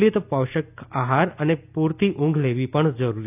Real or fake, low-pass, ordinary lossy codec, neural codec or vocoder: real; 3.6 kHz; none; none